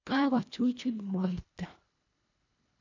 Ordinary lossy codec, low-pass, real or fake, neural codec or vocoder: none; 7.2 kHz; fake; codec, 24 kHz, 1.5 kbps, HILCodec